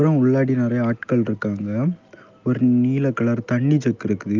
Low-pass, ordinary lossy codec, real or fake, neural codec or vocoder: 7.2 kHz; Opus, 32 kbps; real; none